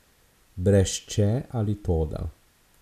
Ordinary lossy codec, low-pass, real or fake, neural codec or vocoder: none; 14.4 kHz; real; none